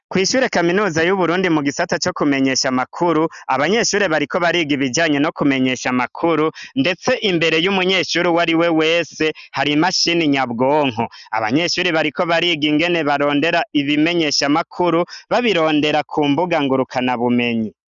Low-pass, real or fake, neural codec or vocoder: 7.2 kHz; real; none